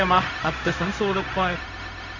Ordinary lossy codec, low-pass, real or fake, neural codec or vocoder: none; 7.2 kHz; fake; codec, 16 kHz, 0.4 kbps, LongCat-Audio-Codec